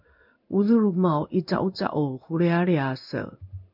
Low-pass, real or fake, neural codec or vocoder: 5.4 kHz; fake; codec, 16 kHz in and 24 kHz out, 1 kbps, XY-Tokenizer